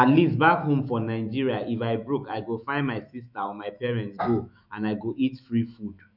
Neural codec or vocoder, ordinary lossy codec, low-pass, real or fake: autoencoder, 48 kHz, 128 numbers a frame, DAC-VAE, trained on Japanese speech; none; 5.4 kHz; fake